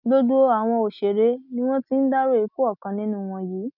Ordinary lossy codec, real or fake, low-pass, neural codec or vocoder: none; real; 5.4 kHz; none